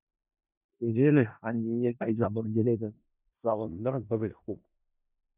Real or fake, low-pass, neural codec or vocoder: fake; 3.6 kHz; codec, 16 kHz in and 24 kHz out, 0.4 kbps, LongCat-Audio-Codec, four codebook decoder